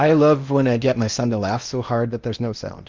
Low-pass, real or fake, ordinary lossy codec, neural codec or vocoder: 7.2 kHz; fake; Opus, 32 kbps; codec, 16 kHz in and 24 kHz out, 0.8 kbps, FocalCodec, streaming, 65536 codes